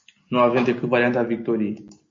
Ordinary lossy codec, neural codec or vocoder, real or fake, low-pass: MP3, 48 kbps; none; real; 7.2 kHz